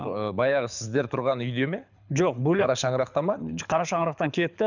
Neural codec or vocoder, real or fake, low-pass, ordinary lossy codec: codec, 24 kHz, 6 kbps, HILCodec; fake; 7.2 kHz; none